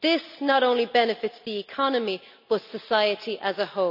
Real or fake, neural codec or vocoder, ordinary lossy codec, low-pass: real; none; none; 5.4 kHz